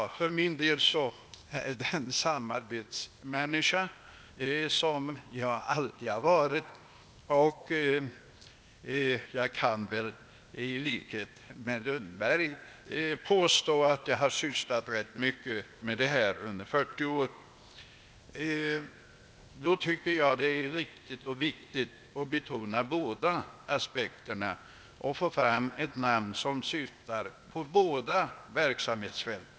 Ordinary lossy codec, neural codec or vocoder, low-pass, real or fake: none; codec, 16 kHz, 0.8 kbps, ZipCodec; none; fake